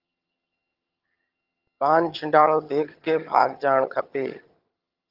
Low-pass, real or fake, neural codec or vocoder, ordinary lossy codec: 5.4 kHz; fake; vocoder, 22.05 kHz, 80 mel bands, HiFi-GAN; Opus, 24 kbps